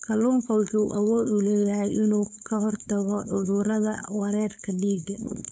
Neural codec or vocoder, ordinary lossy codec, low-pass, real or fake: codec, 16 kHz, 4.8 kbps, FACodec; none; none; fake